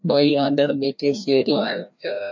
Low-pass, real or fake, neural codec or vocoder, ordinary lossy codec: 7.2 kHz; fake; codec, 16 kHz, 1 kbps, FreqCodec, larger model; MP3, 48 kbps